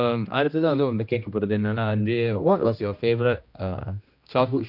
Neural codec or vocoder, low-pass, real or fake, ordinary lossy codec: codec, 16 kHz, 1 kbps, X-Codec, HuBERT features, trained on general audio; 5.4 kHz; fake; none